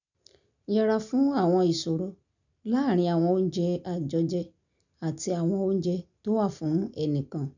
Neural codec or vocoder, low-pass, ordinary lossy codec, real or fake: none; 7.2 kHz; none; real